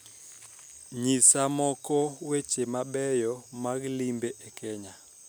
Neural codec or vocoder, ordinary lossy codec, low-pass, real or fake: none; none; none; real